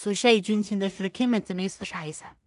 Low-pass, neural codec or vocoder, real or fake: 10.8 kHz; codec, 16 kHz in and 24 kHz out, 0.4 kbps, LongCat-Audio-Codec, two codebook decoder; fake